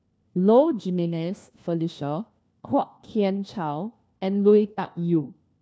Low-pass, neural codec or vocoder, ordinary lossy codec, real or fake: none; codec, 16 kHz, 1 kbps, FunCodec, trained on LibriTTS, 50 frames a second; none; fake